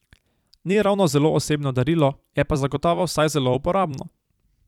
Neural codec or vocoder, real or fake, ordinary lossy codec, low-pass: vocoder, 44.1 kHz, 128 mel bands every 256 samples, BigVGAN v2; fake; none; 19.8 kHz